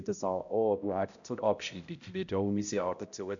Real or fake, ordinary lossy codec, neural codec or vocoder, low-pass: fake; none; codec, 16 kHz, 0.5 kbps, X-Codec, HuBERT features, trained on balanced general audio; 7.2 kHz